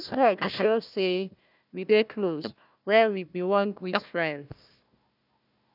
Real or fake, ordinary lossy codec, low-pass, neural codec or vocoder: fake; none; 5.4 kHz; codec, 16 kHz, 1 kbps, FunCodec, trained on Chinese and English, 50 frames a second